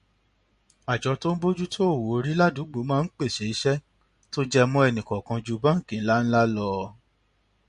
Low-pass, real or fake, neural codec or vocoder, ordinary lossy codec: 10.8 kHz; real; none; MP3, 48 kbps